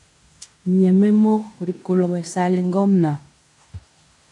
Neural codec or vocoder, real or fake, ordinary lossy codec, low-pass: codec, 16 kHz in and 24 kHz out, 0.9 kbps, LongCat-Audio-Codec, fine tuned four codebook decoder; fake; AAC, 64 kbps; 10.8 kHz